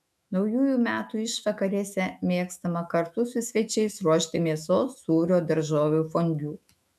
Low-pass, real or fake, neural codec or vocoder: 14.4 kHz; fake; autoencoder, 48 kHz, 128 numbers a frame, DAC-VAE, trained on Japanese speech